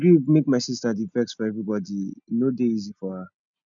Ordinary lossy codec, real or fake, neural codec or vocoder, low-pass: none; real; none; 7.2 kHz